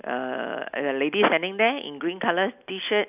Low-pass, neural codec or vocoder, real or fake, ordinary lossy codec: 3.6 kHz; none; real; none